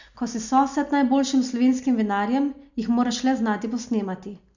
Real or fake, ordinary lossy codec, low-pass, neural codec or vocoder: real; none; 7.2 kHz; none